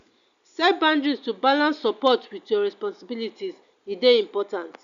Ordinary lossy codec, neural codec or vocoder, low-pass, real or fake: none; none; 7.2 kHz; real